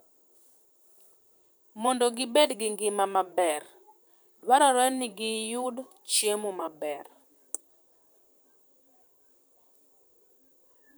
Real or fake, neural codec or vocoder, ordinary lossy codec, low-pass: fake; vocoder, 44.1 kHz, 128 mel bands, Pupu-Vocoder; none; none